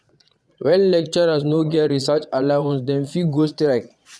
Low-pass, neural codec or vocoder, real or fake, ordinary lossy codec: none; vocoder, 22.05 kHz, 80 mel bands, Vocos; fake; none